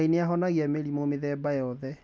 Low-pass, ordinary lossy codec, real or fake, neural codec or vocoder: none; none; real; none